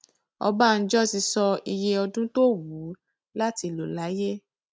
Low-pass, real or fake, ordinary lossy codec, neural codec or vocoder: none; real; none; none